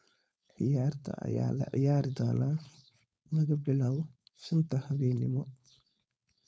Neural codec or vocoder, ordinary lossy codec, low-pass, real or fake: codec, 16 kHz, 4.8 kbps, FACodec; none; none; fake